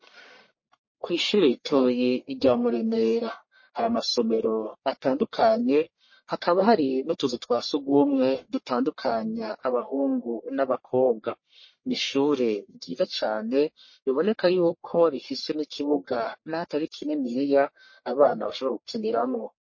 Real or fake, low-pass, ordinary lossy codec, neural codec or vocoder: fake; 7.2 kHz; MP3, 32 kbps; codec, 44.1 kHz, 1.7 kbps, Pupu-Codec